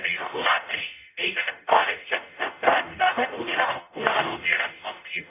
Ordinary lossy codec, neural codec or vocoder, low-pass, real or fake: none; codec, 44.1 kHz, 0.9 kbps, DAC; 3.6 kHz; fake